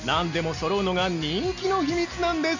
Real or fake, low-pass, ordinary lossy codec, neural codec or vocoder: real; 7.2 kHz; none; none